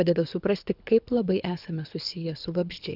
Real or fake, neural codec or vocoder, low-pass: fake; codec, 24 kHz, 6 kbps, HILCodec; 5.4 kHz